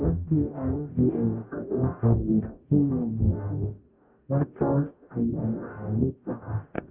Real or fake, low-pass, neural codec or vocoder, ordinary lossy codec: fake; 3.6 kHz; codec, 44.1 kHz, 0.9 kbps, DAC; Opus, 24 kbps